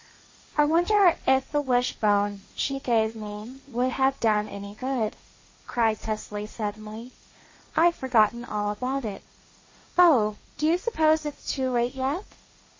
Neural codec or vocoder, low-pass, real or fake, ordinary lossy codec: codec, 16 kHz, 1.1 kbps, Voila-Tokenizer; 7.2 kHz; fake; MP3, 32 kbps